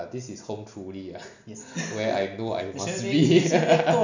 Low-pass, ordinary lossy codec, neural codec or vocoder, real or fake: 7.2 kHz; none; none; real